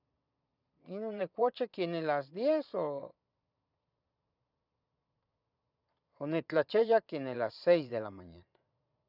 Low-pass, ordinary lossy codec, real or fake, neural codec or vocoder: 5.4 kHz; none; fake; vocoder, 22.05 kHz, 80 mel bands, Vocos